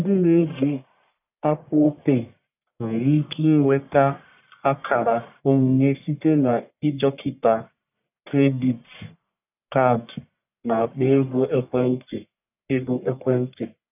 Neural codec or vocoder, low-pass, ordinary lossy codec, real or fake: codec, 44.1 kHz, 1.7 kbps, Pupu-Codec; 3.6 kHz; none; fake